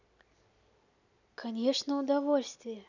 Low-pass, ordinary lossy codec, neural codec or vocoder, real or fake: 7.2 kHz; none; none; real